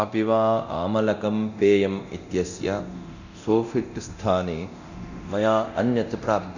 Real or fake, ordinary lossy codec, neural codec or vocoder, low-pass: fake; none; codec, 24 kHz, 0.9 kbps, DualCodec; 7.2 kHz